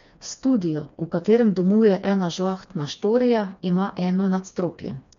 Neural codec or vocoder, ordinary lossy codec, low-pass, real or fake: codec, 16 kHz, 2 kbps, FreqCodec, smaller model; MP3, 64 kbps; 7.2 kHz; fake